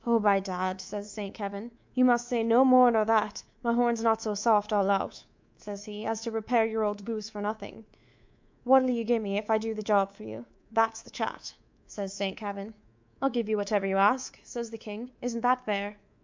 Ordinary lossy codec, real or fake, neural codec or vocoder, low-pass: MP3, 64 kbps; fake; codec, 24 kHz, 3.1 kbps, DualCodec; 7.2 kHz